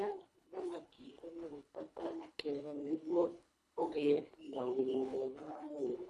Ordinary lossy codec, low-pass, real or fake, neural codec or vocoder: none; none; fake; codec, 24 kHz, 1.5 kbps, HILCodec